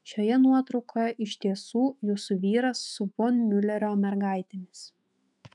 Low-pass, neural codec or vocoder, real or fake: 10.8 kHz; autoencoder, 48 kHz, 128 numbers a frame, DAC-VAE, trained on Japanese speech; fake